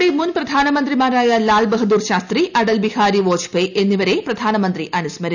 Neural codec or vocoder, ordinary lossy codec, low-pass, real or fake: none; none; 7.2 kHz; real